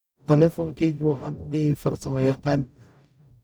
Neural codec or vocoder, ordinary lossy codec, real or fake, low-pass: codec, 44.1 kHz, 0.9 kbps, DAC; none; fake; none